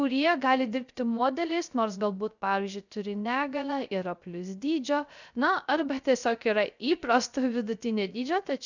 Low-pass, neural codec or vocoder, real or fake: 7.2 kHz; codec, 16 kHz, 0.3 kbps, FocalCodec; fake